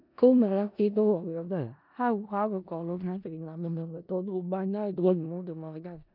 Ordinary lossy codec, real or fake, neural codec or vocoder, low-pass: none; fake; codec, 16 kHz in and 24 kHz out, 0.4 kbps, LongCat-Audio-Codec, four codebook decoder; 5.4 kHz